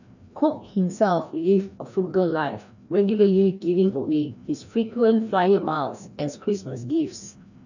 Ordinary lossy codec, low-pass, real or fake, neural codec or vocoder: none; 7.2 kHz; fake; codec, 16 kHz, 1 kbps, FreqCodec, larger model